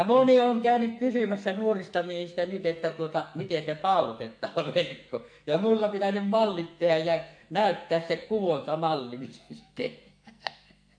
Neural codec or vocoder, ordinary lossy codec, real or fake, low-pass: codec, 44.1 kHz, 2.6 kbps, SNAC; AAC, 64 kbps; fake; 9.9 kHz